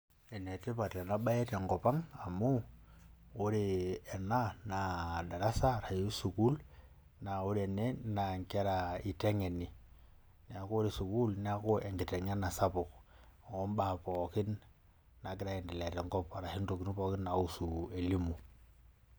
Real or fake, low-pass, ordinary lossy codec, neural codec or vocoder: real; none; none; none